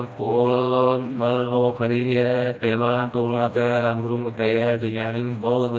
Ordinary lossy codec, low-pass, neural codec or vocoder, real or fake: none; none; codec, 16 kHz, 1 kbps, FreqCodec, smaller model; fake